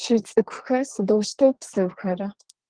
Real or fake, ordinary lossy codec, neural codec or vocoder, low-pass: fake; Opus, 16 kbps; codec, 32 kHz, 1.9 kbps, SNAC; 9.9 kHz